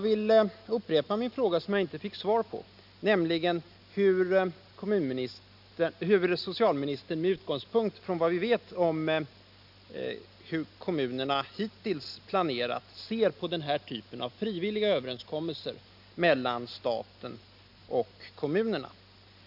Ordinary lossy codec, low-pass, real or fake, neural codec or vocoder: AAC, 48 kbps; 5.4 kHz; real; none